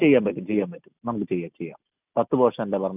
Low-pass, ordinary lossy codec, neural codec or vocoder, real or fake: 3.6 kHz; none; none; real